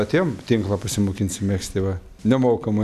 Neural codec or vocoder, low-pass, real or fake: none; 14.4 kHz; real